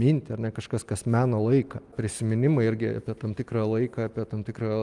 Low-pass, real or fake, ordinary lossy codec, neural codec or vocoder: 10.8 kHz; real; Opus, 32 kbps; none